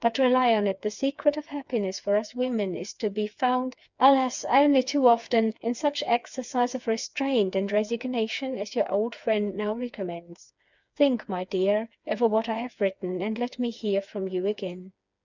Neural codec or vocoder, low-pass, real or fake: codec, 16 kHz, 4 kbps, FreqCodec, smaller model; 7.2 kHz; fake